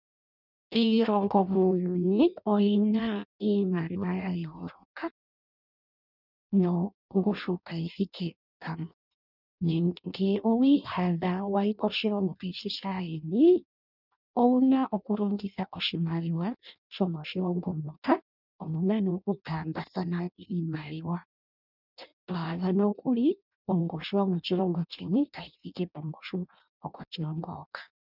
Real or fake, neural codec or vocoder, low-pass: fake; codec, 16 kHz in and 24 kHz out, 0.6 kbps, FireRedTTS-2 codec; 5.4 kHz